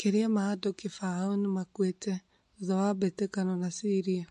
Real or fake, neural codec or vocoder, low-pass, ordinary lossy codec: real; none; 14.4 kHz; MP3, 48 kbps